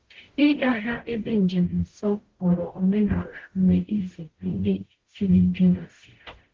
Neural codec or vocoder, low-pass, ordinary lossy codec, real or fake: codec, 44.1 kHz, 0.9 kbps, DAC; 7.2 kHz; Opus, 16 kbps; fake